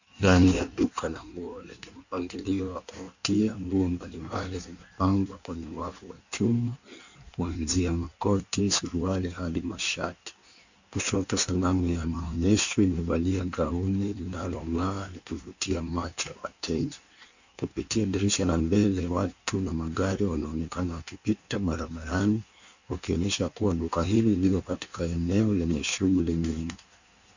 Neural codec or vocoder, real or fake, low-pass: codec, 16 kHz in and 24 kHz out, 1.1 kbps, FireRedTTS-2 codec; fake; 7.2 kHz